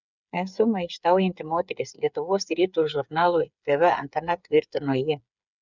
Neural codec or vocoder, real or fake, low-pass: codec, 16 kHz, 8 kbps, FreqCodec, smaller model; fake; 7.2 kHz